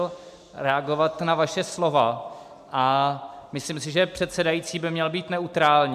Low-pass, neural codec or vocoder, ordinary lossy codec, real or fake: 14.4 kHz; none; MP3, 96 kbps; real